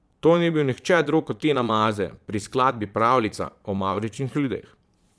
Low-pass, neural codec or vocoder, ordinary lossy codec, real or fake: none; vocoder, 22.05 kHz, 80 mel bands, Vocos; none; fake